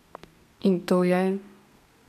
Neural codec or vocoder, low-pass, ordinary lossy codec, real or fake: codec, 32 kHz, 1.9 kbps, SNAC; 14.4 kHz; none; fake